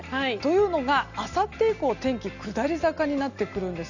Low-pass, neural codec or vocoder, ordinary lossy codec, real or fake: 7.2 kHz; none; none; real